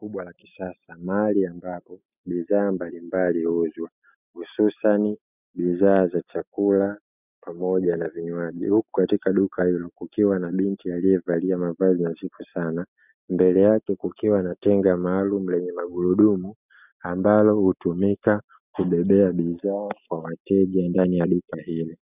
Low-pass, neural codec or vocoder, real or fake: 3.6 kHz; none; real